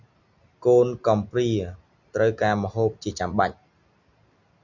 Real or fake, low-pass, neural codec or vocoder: real; 7.2 kHz; none